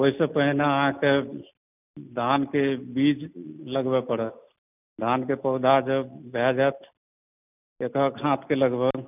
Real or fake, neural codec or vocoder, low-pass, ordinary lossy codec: real; none; 3.6 kHz; none